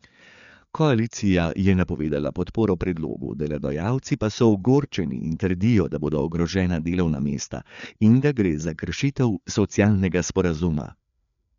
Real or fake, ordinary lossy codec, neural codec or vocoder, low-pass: fake; none; codec, 16 kHz, 4 kbps, FreqCodec, larger model; 7.2 kHz